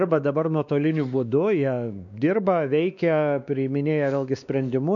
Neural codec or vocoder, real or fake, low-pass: codec, 16 kHz, 2 kbps, X-Codec, WavLM features, trained on Multilingual LibriSpeech; fake; 7.2 kHz